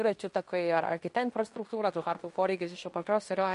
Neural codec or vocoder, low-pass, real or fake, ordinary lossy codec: codec, 16 kHz in and 24 kHz out, 0.9 kbps, LongCat-Audio-Codec, fine tuned four codebook decoder; 10.8 kHz; fake; MP3, 48 kbps